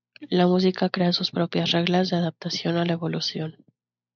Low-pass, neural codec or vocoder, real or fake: 7.2 kHz; none; real